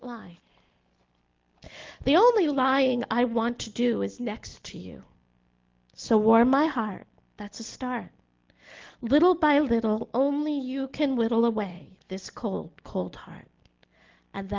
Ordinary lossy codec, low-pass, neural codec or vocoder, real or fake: Opus, 16 kbps; 7.2 kHz; none; real